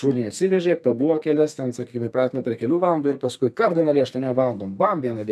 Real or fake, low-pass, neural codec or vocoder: fake; 14.4 kHz; codec, 32 kHz, 1.9 kbps, SNAC